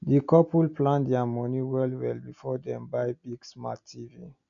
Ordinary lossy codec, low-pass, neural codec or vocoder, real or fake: none; 7.2 kHz; none; real